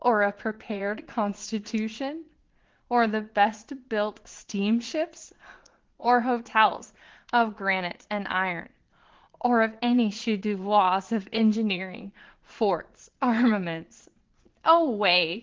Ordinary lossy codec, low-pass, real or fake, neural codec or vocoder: Opus, 16 kbps; 7.2 kHz; fake; vocoder, 22.05 kHz, 80 mel bands, Vocos